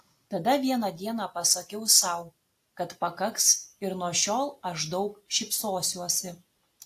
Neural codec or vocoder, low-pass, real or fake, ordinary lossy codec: none; 14.4 kHz; real; AAC, 64 kbps